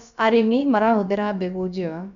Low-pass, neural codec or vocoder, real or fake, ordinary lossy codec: 7.2 kHz; codec, 16 kHz, about 1 kbps, DyCAST, with the encoder's durations; fake; none